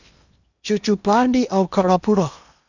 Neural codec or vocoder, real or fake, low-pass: codec, 16 kHz in and 24 kHz out, 0.8 kbps, FocalCodec, streaming, 65536 codes; fake; 7.2 kHz